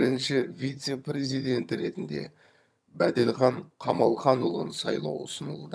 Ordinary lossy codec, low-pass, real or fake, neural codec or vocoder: none; none; fake; vocoder, 22.05 kHz, 80 mel bands, HiFi-GAN